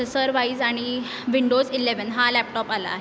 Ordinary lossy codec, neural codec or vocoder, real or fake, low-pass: none; none; real; none